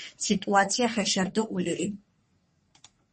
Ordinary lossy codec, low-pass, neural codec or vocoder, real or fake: MP3, 32 kbps; 10.8 kHz; codec, 44.1 kHz, 3.4 kbps, Pupu-Codec; fake